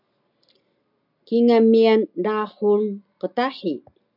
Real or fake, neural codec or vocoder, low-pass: real; none; 5.4 kHz